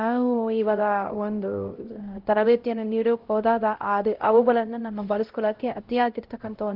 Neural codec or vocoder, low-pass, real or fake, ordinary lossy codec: codec, 16 kHz, 0.5 kbps, X-Codec, HuBERT features, trained on LibriSpeech; 5.4 kHz; fake; Opus, 16 kbps